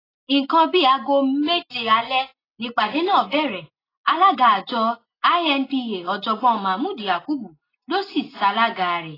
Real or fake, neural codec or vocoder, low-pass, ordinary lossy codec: real; none; 5.4 kHz; AAC, 24 kbps